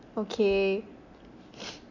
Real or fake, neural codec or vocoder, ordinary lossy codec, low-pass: real; none; none; 7.2 kHz